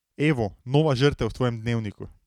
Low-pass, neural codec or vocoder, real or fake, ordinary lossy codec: 19.8 kHz; none; real; none